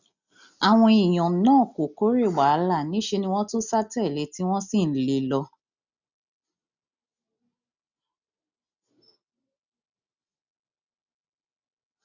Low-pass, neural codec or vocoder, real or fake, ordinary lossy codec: 7.2 kHz; none; real; none